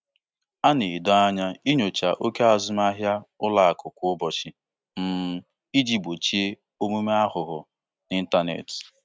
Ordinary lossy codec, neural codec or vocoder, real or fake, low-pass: none; none; real; none